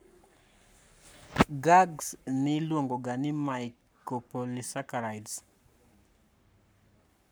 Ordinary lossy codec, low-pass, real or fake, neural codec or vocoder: none; none; fake; codec, 44.1 kHz, 7.8 kbps, Pupu-Codec